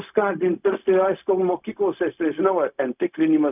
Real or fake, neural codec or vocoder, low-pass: fake; codec, 16 kHz, 0.4 kbps, LongCat-Audio-Codec; 3.6 kHz